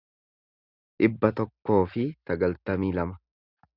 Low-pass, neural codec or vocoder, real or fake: 5.4 kHz; none; real